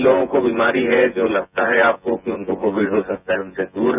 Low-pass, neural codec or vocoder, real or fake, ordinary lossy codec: 3.6 kHz; vocoder, 24 kHz, 100 mel bands, Vocos; fake; none